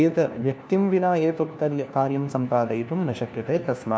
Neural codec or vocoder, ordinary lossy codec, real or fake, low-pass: codec, 16 kHz, 1 kbps, FunCodec, trained on LibriTTS, 50 frames a second; none; fake; none